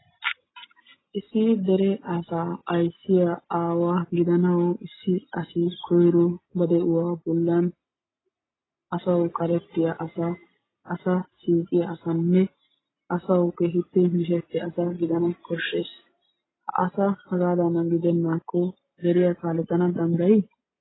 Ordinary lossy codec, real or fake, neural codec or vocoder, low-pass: AAC, 16 kbps; real; none; 7.2 kHz